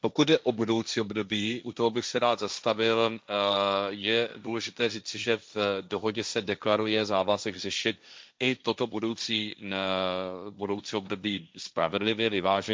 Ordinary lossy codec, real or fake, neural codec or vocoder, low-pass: none; fake; codec, 16 kHz, 1.1 kbps, Voila-Tokenizer; none